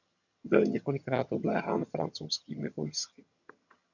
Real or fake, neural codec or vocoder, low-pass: fake; vocoder, 22.05 kHz, 80 mel bands, HiFi-GAN; 7.2 kHz